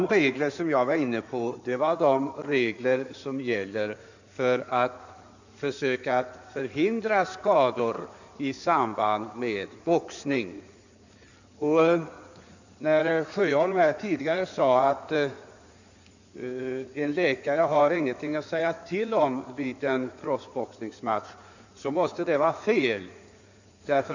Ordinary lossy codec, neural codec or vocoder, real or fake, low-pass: none; codec, 16 kHz in and 24 kHz out, 2.2 kbps, FireRedTTS-2 codec; fake; 7.2 kHz